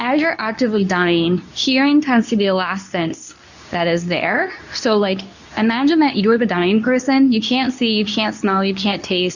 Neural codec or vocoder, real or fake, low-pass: codec, 24 kHz, 0.9 kbps, WavTokenizer, medium speech release version 2; fake; 7.2 kHz